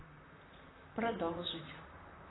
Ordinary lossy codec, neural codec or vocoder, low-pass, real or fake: AAC, 16 kbps; vocoder, 44.1 kHz, 128 mel bands, Pupu-Vocoder; 7.2 kHz; fake